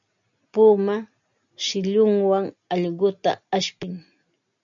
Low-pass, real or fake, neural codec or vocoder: 7.2 kHz; real; none